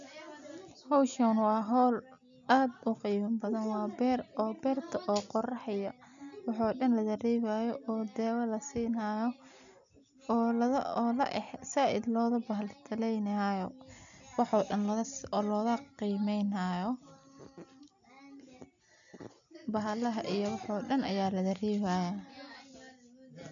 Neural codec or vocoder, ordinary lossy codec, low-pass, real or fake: none; none; 7.2 kHz; real